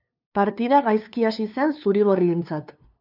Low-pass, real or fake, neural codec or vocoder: 5.4 kHz; fake; codec, 16 kHz, 2 kbps, FunCodec, trained on LibriTTS, 25 frames a second